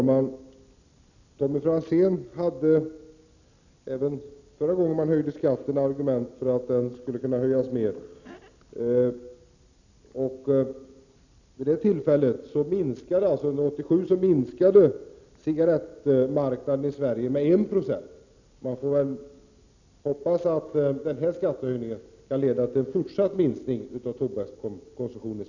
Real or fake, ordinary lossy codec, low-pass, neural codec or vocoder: real; none; 7.2 kHz; none